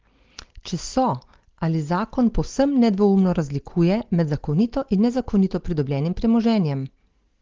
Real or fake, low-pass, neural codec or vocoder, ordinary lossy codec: real; 7.2 kHz; none; Opus, 16 kbps